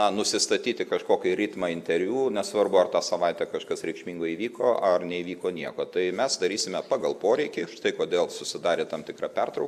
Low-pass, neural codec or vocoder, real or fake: 14.4 kHz; none; real